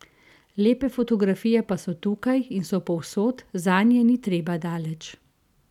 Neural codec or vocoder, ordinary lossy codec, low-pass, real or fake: none; none; 19.8 kHz; real